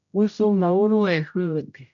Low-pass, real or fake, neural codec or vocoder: 7.2 kHz; fake; codec, 16 kHz, 0.5 kbps, X-Codec, HuBERT features, trained on general audio